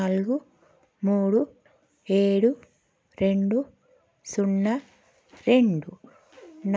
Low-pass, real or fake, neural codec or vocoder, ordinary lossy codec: none; real; none; none